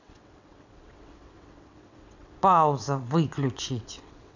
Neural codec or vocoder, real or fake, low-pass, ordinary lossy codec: vocoder, 22.05 kHz, 80 mel bands, WaveNeXt; fake; 7.2 kHz; none